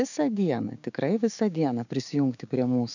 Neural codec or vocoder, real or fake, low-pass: codec, 16 kHz, 6 kbps, DAC; fake; 7.2 kHz